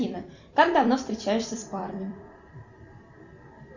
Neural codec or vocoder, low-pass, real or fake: vocoder, 44.1 kHz, 128 mel bands every 256 samples, BigVGAN v2; 7.2 kHz; fake